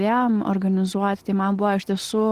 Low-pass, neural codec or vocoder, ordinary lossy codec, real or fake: 14.4 kHz; none; Opus, 16 kbps; real